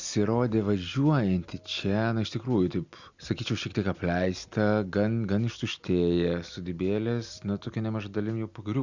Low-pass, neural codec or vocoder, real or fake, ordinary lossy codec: 7.2 kHz; none; real; Opus, 64 kbps